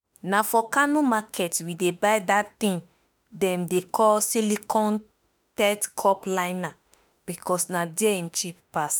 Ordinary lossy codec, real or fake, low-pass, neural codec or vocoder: none; fake; none; autoencoder, 48 kHz, 32 numbers a frame, DAC-VAE, trained on Japanese speech